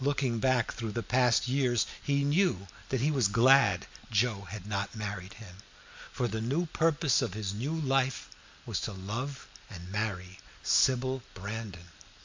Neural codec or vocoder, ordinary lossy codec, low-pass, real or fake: none; MP3, 64 kbps; 7.2 kHz; real